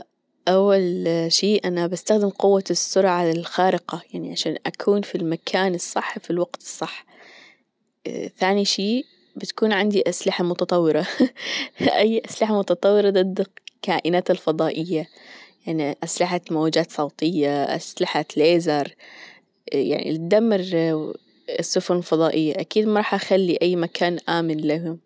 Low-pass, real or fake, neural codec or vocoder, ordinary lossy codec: none; real; none; none